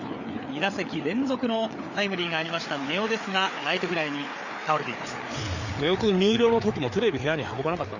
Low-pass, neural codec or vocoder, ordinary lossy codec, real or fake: 7.2 kHz; codec, 16 kHz, 4 kbps, FreqCodec, larger model; none; fake